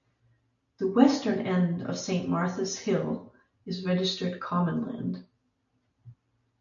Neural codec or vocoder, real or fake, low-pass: none; real; 7.2 kHz